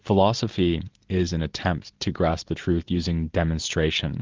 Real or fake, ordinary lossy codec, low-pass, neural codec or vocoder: real; Opus, 24 kbps; 7.2 kHz; none